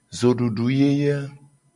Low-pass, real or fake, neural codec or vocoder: 10.8 kHz; real; none